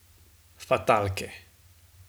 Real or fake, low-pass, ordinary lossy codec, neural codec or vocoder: real; none; none; none